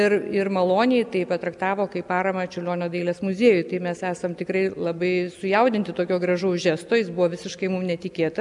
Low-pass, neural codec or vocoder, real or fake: 10.8 kHz; none; real